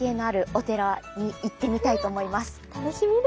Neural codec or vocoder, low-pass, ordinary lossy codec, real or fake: none; none; none; real